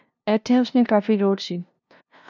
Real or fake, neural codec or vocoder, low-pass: fake; codec, 16 kHz, 0.5 kbps, FunCodec, trained on LibriTTS, 25 frames a second; 7.2 kHz